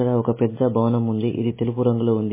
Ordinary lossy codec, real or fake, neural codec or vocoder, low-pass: MP3, 16 kbps; real; none; 3.6 kHz